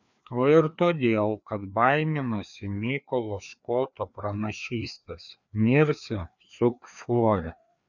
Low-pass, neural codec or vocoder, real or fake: 7.2 kHz; codec, 16 kHz, 2 kbps, FreqCodec, larger model; fake